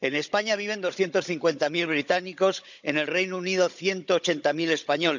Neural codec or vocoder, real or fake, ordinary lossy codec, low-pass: codec, 16 kHz, 16 kbps, FunCodec, trained on Chinese and English, 50 frames a second; fake; none; 7.2 kHz